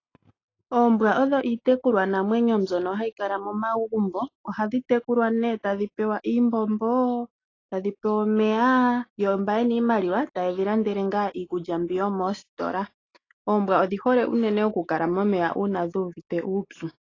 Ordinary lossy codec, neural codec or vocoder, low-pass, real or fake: AAC, 32 kbps; none; 7.2 kHz; real